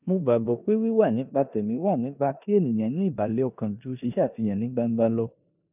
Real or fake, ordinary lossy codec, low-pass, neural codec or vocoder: fake; none; 3.6 kHz; codec, 16 kHz in and 24 kHz out, 0.9 kbps, LongCat-Audio-Codec, four codebook decoder